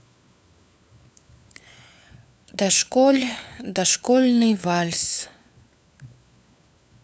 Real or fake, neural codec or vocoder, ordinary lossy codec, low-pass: fake; codec, 16 kHz, 4 kbps, FunCodec, trained on LibriTTS, 50 frames a second; none; none